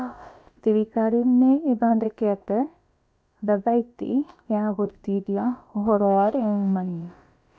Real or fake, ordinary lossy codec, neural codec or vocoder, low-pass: fake; none; codec, 16 kHz, about 1 kbps, DyCAST, with the encoder's durations; none